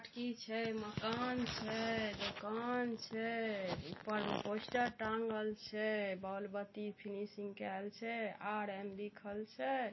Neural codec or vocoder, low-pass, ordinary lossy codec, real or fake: none; 7.2 kHz; MP3, 24 kbps; real